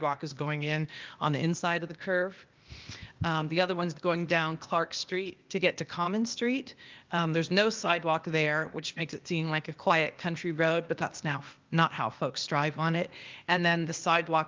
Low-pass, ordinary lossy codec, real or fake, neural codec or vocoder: 7.2 kHz; Opus, 24 kbps; fake; codec, 16 kHz, 0.8 kbps, ZipCodec